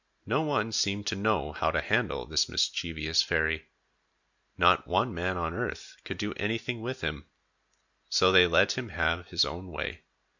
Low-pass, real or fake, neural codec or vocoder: 7.2 kHz; real; none